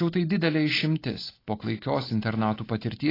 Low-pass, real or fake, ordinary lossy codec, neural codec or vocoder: 5.4 kHz; real; AAC, 24 kbps; none